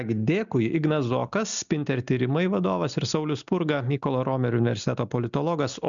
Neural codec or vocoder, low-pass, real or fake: none; 7.2 kHz; real